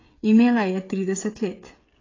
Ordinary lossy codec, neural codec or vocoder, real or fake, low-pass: AAC, 32 kbps; codec, 16 kHz, 16 kbps, FreqCodec, smaller model; fake; 7.2 kHz